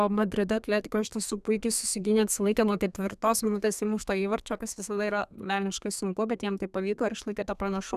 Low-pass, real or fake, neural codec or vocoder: 14.4 kHz; fake; codec, 32 kHz, 1.9 kbps, SNAC